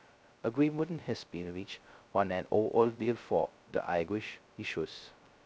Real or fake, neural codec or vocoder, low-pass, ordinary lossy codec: fake; codec, 16 kHz, 0.2 kbps, FocalCodec; none; none